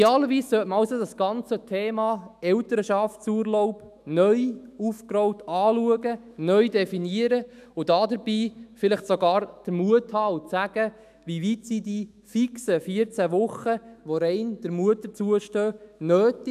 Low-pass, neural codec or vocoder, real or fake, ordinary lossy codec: 14.4 kHz; autoencoder, 48 kHz, 128 numbers a frame, DAC-VAE, trained on Japanese speech; fake; none